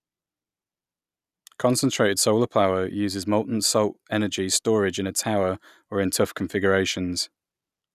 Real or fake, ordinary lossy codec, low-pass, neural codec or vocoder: real; none; 14.4 kHz; none